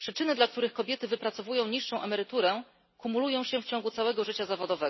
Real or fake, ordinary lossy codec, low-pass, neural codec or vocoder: real; MP3, 24 kbps; 7.2 kHz; none